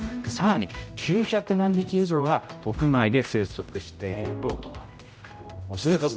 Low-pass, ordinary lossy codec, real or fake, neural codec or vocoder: none; none; fake; codec, 16 kHz, 0.5 kbps, X-Codec, HuBERT features, trained on general audio